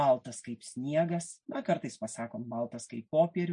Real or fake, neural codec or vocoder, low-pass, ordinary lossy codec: real; none; 9.9 kHz; MP3, 48 kbps